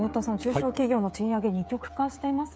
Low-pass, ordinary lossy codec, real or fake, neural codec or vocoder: none; none; fake; codec, 16 kHz, 16 kbps, FreqCodec, smaller model